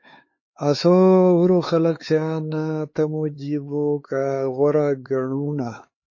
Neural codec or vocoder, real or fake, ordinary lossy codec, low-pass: codec, 16 kHz, 4 kbps, X-Codec, HuBERT features, trained on LibriSpeech; fake; MP3, 32 kbps; 7.2 kHz